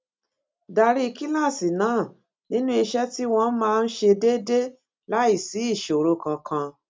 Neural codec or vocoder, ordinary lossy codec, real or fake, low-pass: none; none; real; none